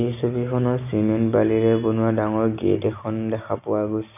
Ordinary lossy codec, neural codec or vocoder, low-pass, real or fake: none; none; 3.6 kHz; real